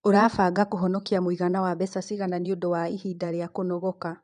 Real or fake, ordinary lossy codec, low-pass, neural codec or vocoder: fake; none; 9.9 kHz; vocoder, 22.05 kHz, 80 mel bands, Vocos